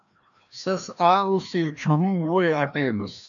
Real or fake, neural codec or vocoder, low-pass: fake; codec, 16 kHz, 1 kbps, FreqCodec, larger model; 7.2 kHz